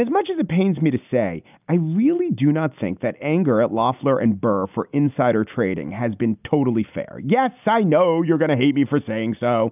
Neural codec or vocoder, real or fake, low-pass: none; real; 3.6 kHz